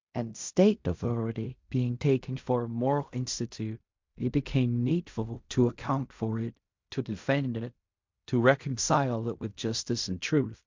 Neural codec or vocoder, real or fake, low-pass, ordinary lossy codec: codec, 16 kHz in and 24 kHz out, 0.4 kbps, LongCat-Audio-Codec, fine tuned four codebook decoder; fake; 7.2 kHz; MP3, 64 kbps